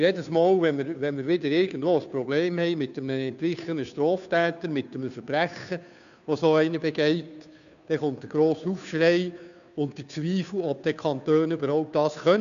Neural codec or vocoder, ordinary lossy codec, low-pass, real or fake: codec, 16 kHz, 2 kbps, FunCodec, trained on Chinese and English, 25 frames a second; none; 7.2 kHz; fake